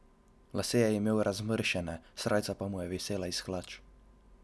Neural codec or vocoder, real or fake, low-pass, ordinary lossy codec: none; real; none; none